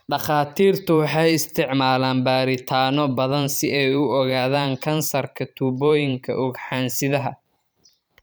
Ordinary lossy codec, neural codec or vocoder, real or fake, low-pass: none; vocoder, 44.1 kHz, 128 mel bands every 512 samples, BigVGAN v2; fake; none